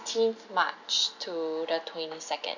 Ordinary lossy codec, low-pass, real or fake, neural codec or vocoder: none; 7.2 kHz; real; none